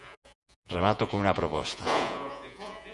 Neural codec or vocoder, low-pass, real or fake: vocoder, 48 kHz, 128 mel bands, Vocos; 10.8 kHz; fake